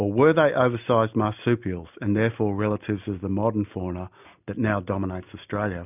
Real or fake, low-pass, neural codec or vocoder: real; 3.6 kHz; none